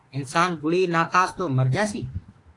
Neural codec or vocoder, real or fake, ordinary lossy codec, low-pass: autoencoder, 48 kHz, 32 numbers a frame, DAC-VAE, trained on Japanese speech; fake; AAC, 48 kbps; 10.8 kHz